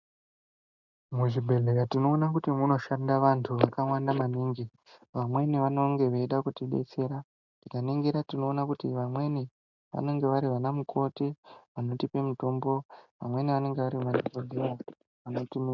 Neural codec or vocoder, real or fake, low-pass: none; real; 7.2 kHz